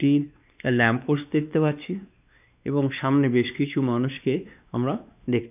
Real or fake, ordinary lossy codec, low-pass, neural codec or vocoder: fake; none; 3.6 kHz; codec, 16 kHz, 2 kbps, X-Codec, WavLM features, trained on Multilingual LibriSpeech